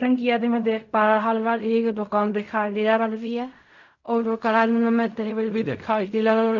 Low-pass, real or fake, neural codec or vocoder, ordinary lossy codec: 7.2 kHz; fake; codec, 16 kHz in and 24 kHz out, 0.4 kbps, LongCat-Audio-Codec, fine tuned four codebook decoder; none